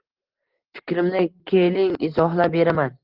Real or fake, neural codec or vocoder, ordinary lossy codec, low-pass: real; none; Opus, 16 kbps; 5.4 kHz